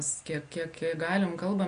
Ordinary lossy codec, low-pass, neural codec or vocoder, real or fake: MP3, 48 kbps; 9.9 kHz; none; real